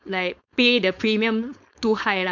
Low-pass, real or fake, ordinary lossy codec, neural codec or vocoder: 7.2 kHz; fake; none; codec, 16 kHz, 4.8 kbps, FACodec